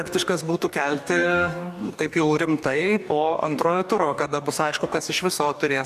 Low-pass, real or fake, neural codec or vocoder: 14.4 kHz; fake; codec, 44.1 kHz, 2.6 kbps, DAC